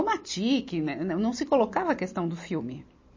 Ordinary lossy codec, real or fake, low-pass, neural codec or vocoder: MP3, 32 kbps; real; 7.2 kHz; none